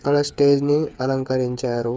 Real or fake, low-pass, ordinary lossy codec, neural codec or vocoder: fake; none; none; codec, 16 kHz, 8 kbps, FreqCodec, smaller model